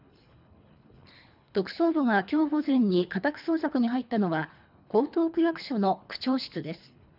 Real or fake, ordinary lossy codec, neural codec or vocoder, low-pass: fake; none; codec, 24 kHz, 3 kbps, HILCodec; 5.4 kHz